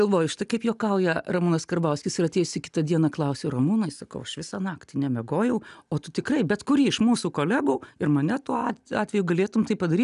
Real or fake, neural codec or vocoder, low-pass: real; none; 10.8 kHz